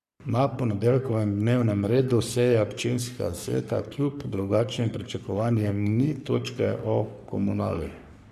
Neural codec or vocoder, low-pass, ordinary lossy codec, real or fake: codec, 44.1 kHz, 3.4 kbps, Pupu-Codec; 14.4 kHz; none; fake